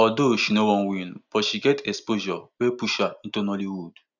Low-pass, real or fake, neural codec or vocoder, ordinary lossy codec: 7.2 kHz; real; none; none